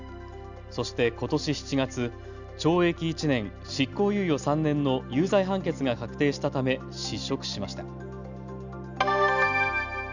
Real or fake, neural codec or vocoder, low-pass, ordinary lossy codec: real; none; 7.2 kHz; none